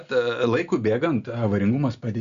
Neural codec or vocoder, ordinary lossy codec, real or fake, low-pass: none; Opus, 64 kbps; real; 7.2 kHz